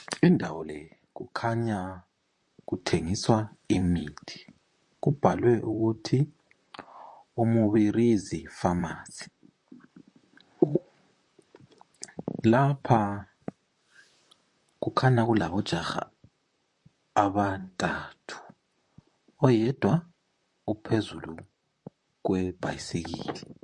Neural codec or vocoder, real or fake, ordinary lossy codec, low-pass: vocoder, 44.1 kHz, 128 mel bands, Pupu-Vocoder; fake; MP3, 48 kbps; 10.8 kHz